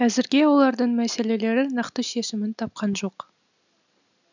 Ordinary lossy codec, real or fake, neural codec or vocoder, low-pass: none; real; none; 7.2 kHz